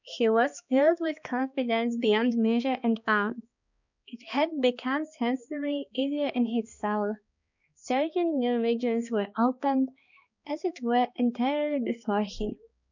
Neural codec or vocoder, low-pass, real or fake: codec, 16 kHz, 2 kbps, X-Codec, HuBERT features, trained on balanced general audio; 7.2 kHz; fake